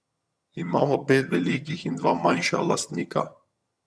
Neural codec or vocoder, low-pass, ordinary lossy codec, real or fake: vocoder, 22.05 kHz, 80 mel bands, HiFi-GAN; none; none; fake